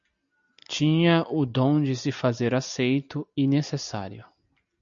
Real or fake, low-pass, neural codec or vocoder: real; 7.2 kHz; none